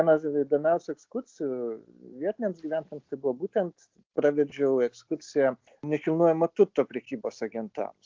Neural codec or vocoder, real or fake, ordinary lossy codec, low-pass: none; real; Opus, 32 kbps; 7.2 kHz